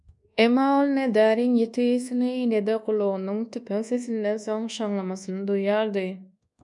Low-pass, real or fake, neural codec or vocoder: 10.8 kHz; fake; codec, 24 kHz, 1.2 kbps, DualCodec